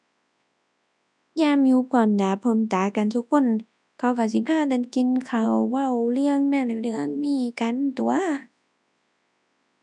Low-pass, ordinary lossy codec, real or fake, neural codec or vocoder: 10.8 kHz; none; fake; codec, 24 kHz, 0.9 kbps, WavTokenizer, large speech release